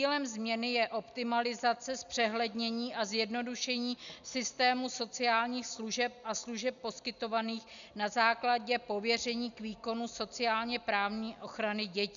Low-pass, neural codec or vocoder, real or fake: 7.2 kHz; none; real